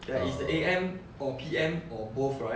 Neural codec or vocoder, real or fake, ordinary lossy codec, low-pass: none; real; none; none